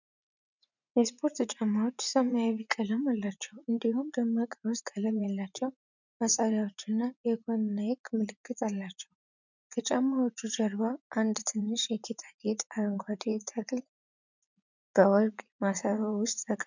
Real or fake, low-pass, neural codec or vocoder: fake; 7.2 kHz; vocoder, 44.1 kHz, 80 mel bands, Vocos